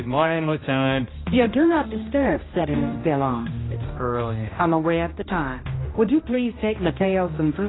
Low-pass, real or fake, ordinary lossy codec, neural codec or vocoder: 7.2 kHz; fake; AAC, 16 kbps; codec, 16 kHz, 1 kbps, X-Codec, HuBERT features, trained on general audio